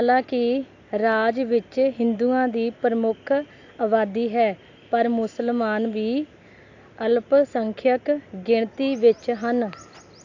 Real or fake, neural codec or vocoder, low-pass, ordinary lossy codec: real; none; 7.2 kHz; AAC, 48 kbps